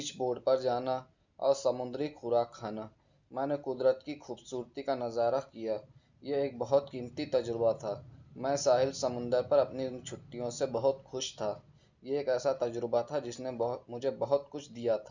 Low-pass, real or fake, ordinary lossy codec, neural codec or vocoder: 7.2 kHz; real; Opus, 64 kbps; none